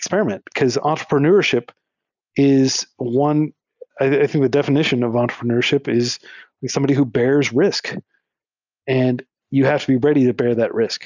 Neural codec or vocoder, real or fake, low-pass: none; real; 7.2 kHz